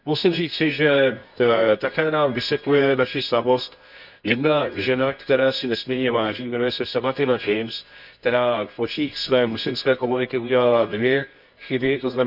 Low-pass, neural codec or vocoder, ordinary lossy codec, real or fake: 5.4 kHz; codec, 24 kHz, 0.9 kbps, WavTokenizer, medium music audio release; none; fake